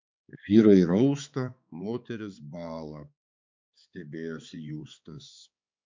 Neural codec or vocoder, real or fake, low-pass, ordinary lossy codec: codec, 16 kHz, 4 kbps, X-Codec, HuBERT features, trained on balanced general audio; fake; 7.2 kHz; MP3, 64 kbps